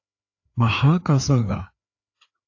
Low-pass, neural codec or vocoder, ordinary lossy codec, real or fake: 7.2 kHz; codec, 16 kHz, 4 kbps, FreqCodec, larger model; AAC, 48 kbps; fake